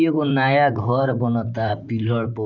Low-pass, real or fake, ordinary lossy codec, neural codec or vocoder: 7.2 kHz; fake; none; vocoder, 44.1 kHz, 128 mel bands, Pupu-Vocoder